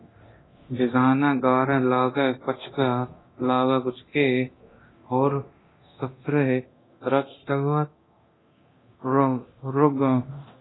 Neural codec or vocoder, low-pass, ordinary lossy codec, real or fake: codec, 24 kHz, 0.9 kbps, DualCodec; 7.2 kHz; AAC, 16 kbps; fake